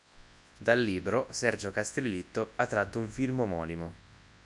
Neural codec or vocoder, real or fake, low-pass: codec, 24 kHz, 0.9 kbps, WavTokenizer, large speech release; fake; 10.8 kHz